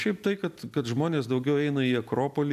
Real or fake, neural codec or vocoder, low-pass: real; none; 14.4 kHz